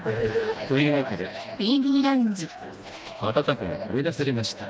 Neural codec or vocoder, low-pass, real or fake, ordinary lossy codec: codec, 16 kHz, 1 kbps, FreqCodec, smaller model; none; fake; none